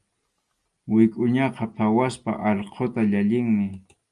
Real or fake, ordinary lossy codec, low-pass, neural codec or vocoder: real; Opus, 32 kbps; 10.8 kHz; none